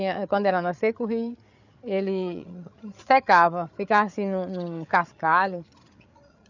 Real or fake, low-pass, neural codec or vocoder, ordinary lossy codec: fake; 7.2 kHz; codec, 16 kHz, 8 kbps, FreqCodec, larger model; none